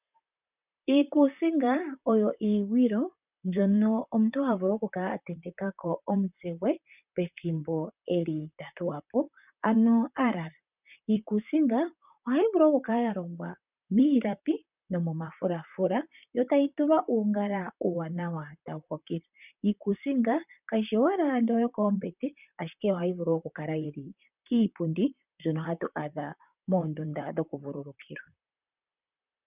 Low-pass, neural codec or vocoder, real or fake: 3.6 kHz; vocoder, 44.1 kHz, 128 mel bands, Pupu-Vocoder; fake